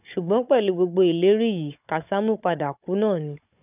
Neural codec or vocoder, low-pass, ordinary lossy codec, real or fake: codec, 16 kHz, 16 kbps, FunCodec, trained on Chinese and English, 50 frames a second; 3.6 kHz; none; fake